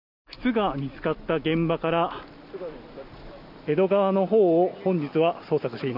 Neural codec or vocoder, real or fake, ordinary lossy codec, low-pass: none; real; none; 5.4 kHz